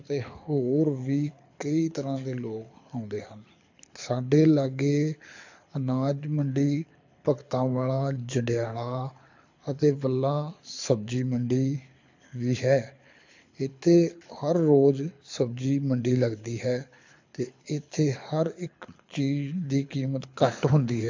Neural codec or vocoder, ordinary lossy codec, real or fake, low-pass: codec, 24 kHz, 6 kbps, HILCodec; AAC, 48 kbps; fake; 7.2 kHz